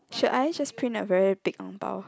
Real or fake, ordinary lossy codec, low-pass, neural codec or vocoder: real; none; none; none